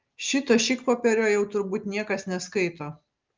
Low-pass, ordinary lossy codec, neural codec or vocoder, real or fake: 7.2 kHz; Opus, 24 kbps; none; real